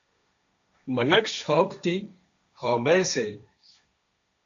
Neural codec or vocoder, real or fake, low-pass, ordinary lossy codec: codec, 16 kHz, 1.1 kbps, Voila-Tokenizer; fake; 7.2 kHz; AAC, 64 kbps